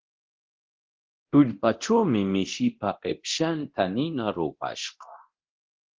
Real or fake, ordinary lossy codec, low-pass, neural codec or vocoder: fake; Opus, 16 kbps; 7.2 kHz; codec, 24 kHz, 0.9 kbps, DualCodec